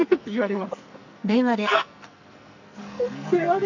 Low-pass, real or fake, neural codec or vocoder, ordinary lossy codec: 7.2 kHz; fake; codec, 44.1 kHz, 2.6 kbps, SNAC; none